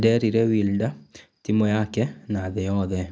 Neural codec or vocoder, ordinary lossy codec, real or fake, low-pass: none; none; real; none